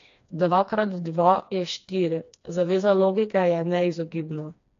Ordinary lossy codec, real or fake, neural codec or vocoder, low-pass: AAC, 48 kbps; fake; codec, 16 kHz, 2 kbps, FreqCodec, smaller model; 7.2 kHz